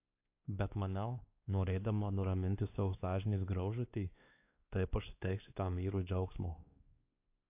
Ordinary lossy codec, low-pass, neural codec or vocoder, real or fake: AAC, 32 kbps; 3.6 kHz; codec, 16 kHz, 2 kbps, X-Codec, WavLM features, trained on Multilingual LibriSpeech; fake